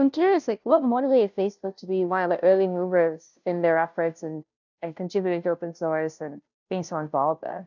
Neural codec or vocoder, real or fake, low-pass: codec, 16 kHz, 0.5 kbps, FunCodec, trained on Chinese and English, 25 frames a second; fake; 7.2 kHz